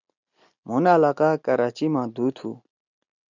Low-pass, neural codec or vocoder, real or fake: 7.2 kHz; none; real